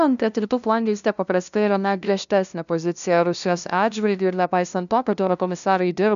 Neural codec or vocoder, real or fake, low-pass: codec, 16 kHz, 0.5 kbps, FunCodec, trained on LibriTTS, 25 frames a second; fake; 7.2 kHz